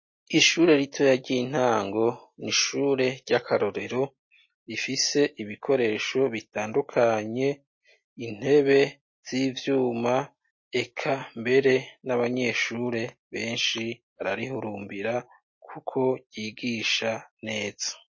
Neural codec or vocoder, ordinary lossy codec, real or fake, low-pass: none; MP3, 32 kbps; real; 7.2 kHz